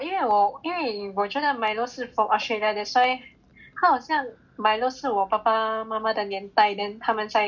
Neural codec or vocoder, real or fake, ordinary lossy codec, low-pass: none; real; none; 7.2 kHz